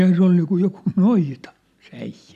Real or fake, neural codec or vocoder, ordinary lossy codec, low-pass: real; none; none; 14.4 kHz